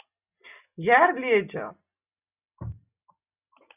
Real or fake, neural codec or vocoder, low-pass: real; none; 3.6 kHz